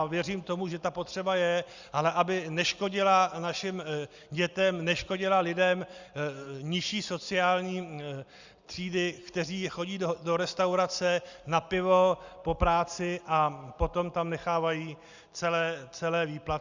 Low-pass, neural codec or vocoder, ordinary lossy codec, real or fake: 7.2 kHz; none; Opus, 64 kbps; real